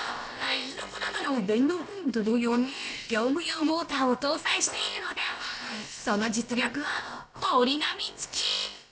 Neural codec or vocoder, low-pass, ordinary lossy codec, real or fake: codec, 16 kHz, about 1 kbps, DyCAST, with the encoder's durations; none; none; fake